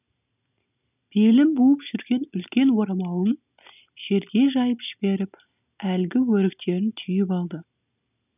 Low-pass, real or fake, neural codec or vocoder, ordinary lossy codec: 3.6 kHz; real; none; none